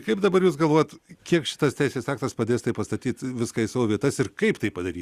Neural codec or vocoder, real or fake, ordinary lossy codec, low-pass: none; real; Opus, 64 kbps; 14.4 kHz